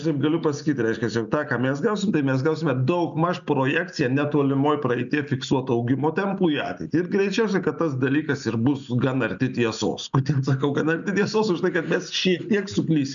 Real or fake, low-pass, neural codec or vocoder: real; 7.2 kHz; none